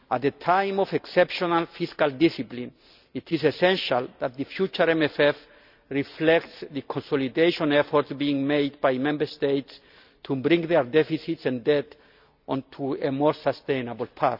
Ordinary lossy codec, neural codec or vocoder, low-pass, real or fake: none; none; 5.4 kHz; real